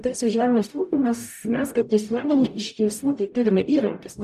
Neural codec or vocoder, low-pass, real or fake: codec, 44.1 kHz, 0.9 kbps, DAC; 14.4 kHz; fake